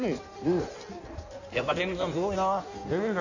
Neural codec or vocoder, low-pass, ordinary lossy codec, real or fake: codec, 16 kHz in and 24 kHz out, 1.1 kbps, FireRedTTS-2 codec; 7.2 kHz; none; fake